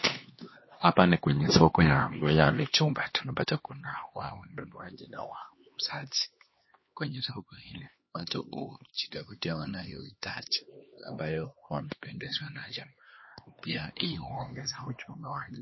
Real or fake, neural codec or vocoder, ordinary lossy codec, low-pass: fake; codec, 16 kHz, 2 kbps, X-Codec, HuBERT features, trained on LibriSpeech; MP3, 24 kbps; 7.2 kHz